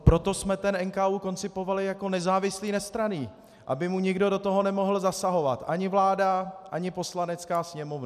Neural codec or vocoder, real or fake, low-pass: none; real; 14.4 kHz